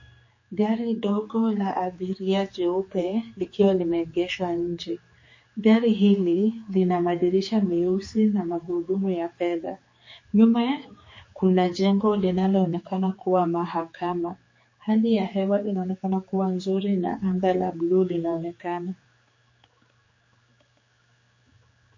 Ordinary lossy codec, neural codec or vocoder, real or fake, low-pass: MP3, 32 kbps; codec, 16 kHz, 4 kbps, X-Codec, HuBERT features, trained on general audio; fake; 7.2 kHz